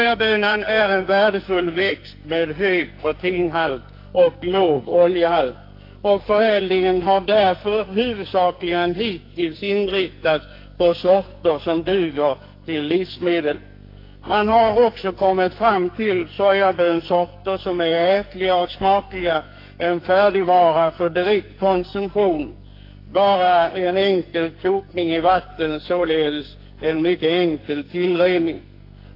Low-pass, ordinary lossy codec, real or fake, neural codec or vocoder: 5.4 kHz; AAC, 32 kbps; fake; codec, 44.1 kHz, 2.6 kbps, SNAC